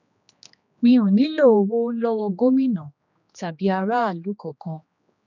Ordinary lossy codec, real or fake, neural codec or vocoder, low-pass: none; fake; codec, 16 kHz, 2 kbps, X-Codec, HuBERT features, trained on general audio; 7.2 kHz